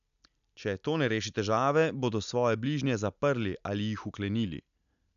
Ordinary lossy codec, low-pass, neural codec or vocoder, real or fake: none; 7.2 kHz; none; real